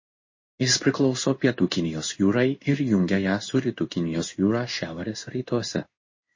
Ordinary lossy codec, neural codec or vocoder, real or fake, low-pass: MP3, 32 kbps; codec, 16 kHz in and 24 kHz out, 1 kbps, XY-Tokenizer; fake; 7.2 kHz